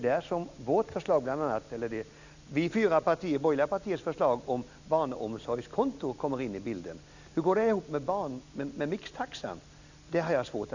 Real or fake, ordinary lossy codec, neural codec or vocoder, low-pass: real; none; none; 7.2 kHz